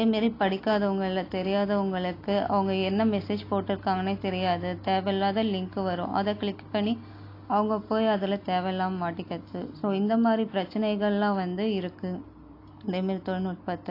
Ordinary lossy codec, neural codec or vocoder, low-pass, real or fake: AAC, 32 kbps; none; 5.4 kHz; real